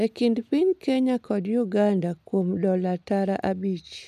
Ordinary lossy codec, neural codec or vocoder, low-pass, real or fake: none; none; 14.4 kHz; real